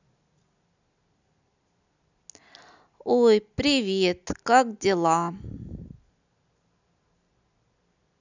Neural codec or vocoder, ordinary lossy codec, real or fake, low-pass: none; none; real; 7.2 kHz